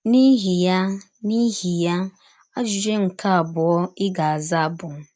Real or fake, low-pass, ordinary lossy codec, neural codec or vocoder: real; none; none; none